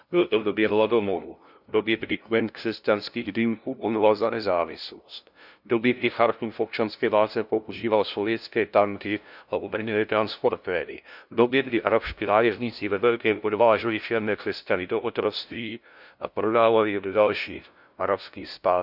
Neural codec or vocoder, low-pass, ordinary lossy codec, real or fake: codec, 16 kHz, 0.5 kbps, FunCodec, trained on LibriTTS, 25 frames a second; 5.4 kHz; none; fake